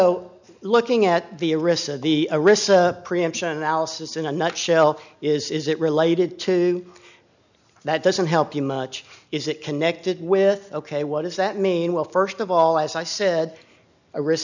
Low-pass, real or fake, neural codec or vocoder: 7.2 kHz; real; none